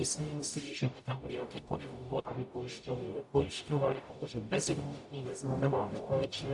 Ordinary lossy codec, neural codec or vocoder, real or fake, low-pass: AAC, 64 kbps; codec, 44.1 kHz, 0.9 kbps, DAC; fake; 10.8 kHz